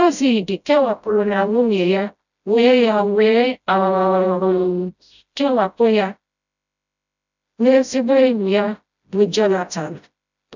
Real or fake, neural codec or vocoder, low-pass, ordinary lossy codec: fake; codec, 16 kHz, 0.5 kbps, FreqCodec, smaller model; 7.2 kHz; none